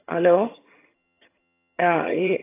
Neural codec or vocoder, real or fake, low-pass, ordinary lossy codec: vocoder, 22.05 kHz, 80 mel bands, HiFi-GAN; fake; 3.6 kHz; AAC, 24 kbps